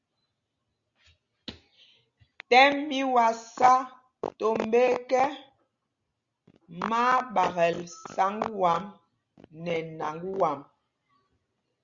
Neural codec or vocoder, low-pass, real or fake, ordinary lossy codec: none; 7.2 kHz; real; Opus, 64 kbps